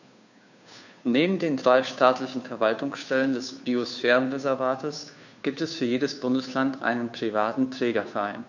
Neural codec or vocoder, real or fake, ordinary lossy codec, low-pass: codec, 16 kHz, 2 kbps, FunCodec, trained on Chinese and English, 25 frames a second; fake; none; 7.2 kHz